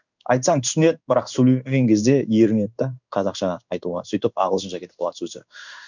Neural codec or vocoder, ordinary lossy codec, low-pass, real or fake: codec, 16 kHz in and 24 kHz out, 1 kbps, XY-Tokenizer; none; 7.2 kHz; fake